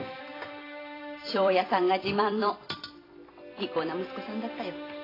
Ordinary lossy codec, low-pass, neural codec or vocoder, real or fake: AAC, 24 kbps; 5.4 kHz; vocoder, 44.1 kHz, 128 mel bands every 256 samples, BigVGAN v2; fake